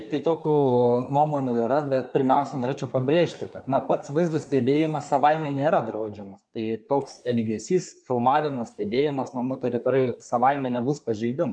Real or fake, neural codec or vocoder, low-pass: fake; codec, 24 kHz, 1 kbps, SNAC; 9.9 kHz